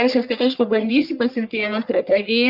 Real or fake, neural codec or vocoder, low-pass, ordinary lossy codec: fake; codec, 44.1 kHz, 1.7 kbps, Pupu-Codec; 5.4 kHz; Opus, 64 kbps